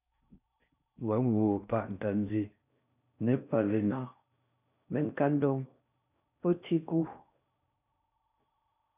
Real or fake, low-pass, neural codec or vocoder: fake; 3.6 kHz; codec, 16 kHz in and 24 kHz out, 0.6 kbps, FocalCodec, streaming, 4096 codes